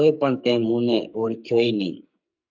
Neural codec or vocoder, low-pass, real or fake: codec, 44.1 kHz, 2.6 kbps, SNAC; 7.2 kHz; fake